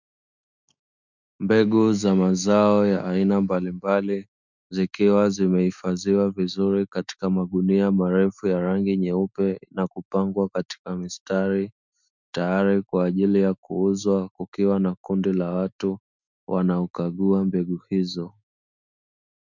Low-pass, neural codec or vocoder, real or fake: 7.2 kHz; autoencoder, 48 kHz, 128 numbers a frame, DAC-VAE, trained on Japanese speech; fake